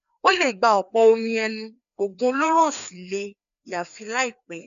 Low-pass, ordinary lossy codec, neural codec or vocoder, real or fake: 7.2 kHz; none; codec, 16 kHz, 2 kbps, FreqCodec, larger model; fake